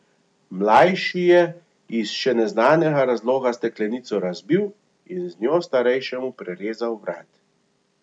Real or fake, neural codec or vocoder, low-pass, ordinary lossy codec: real; none; 9.9 kHz; none